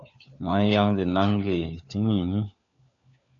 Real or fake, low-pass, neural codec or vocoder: fake; 7.2 kHz; codec, 16 kHz, 4 kbps, FunCodec, trained on LibriTTS, 50 frames a second